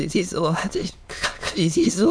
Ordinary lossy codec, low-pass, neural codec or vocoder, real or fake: none; none; autoencoder, 22.05 kHz, a latent of 192 numbers a frame, VITS, trained on many speakers; fake